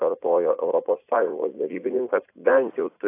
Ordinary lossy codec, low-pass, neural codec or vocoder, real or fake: AAC, 24 kbps; 3.6 kHz; vocoder, 44.1 kHz, 80 mel bands, Vocos; fake